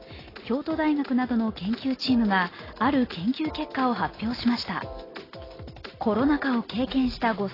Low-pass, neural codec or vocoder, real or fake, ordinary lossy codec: 5.4 kHz; none; real; AAC, 24 kbps